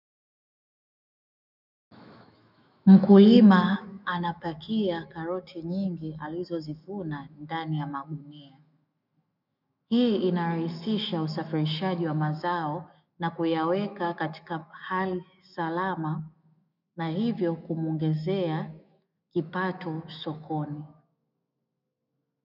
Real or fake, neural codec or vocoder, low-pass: fake; autoencoder, 48 kHz, 128 numbers a frame, DAC-VAE, trained on Japanese speech; 5.4 kHz